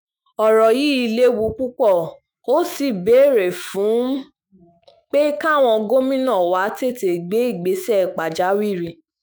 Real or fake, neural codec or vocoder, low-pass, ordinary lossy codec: fake; autoencoder, 48 kHz, 128 numbers a frame, DAC-VAE, trained on Japanese speech; none; none